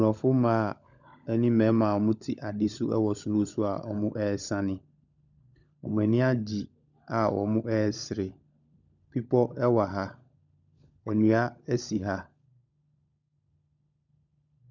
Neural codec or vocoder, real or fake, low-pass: codec, 16 kHz, 16 kbps, FunCodec, trained on LibriTTS, 50 frames a second; fake; 7.2 kHz